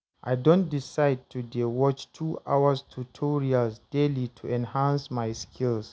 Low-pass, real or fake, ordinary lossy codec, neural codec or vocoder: none; real; none; none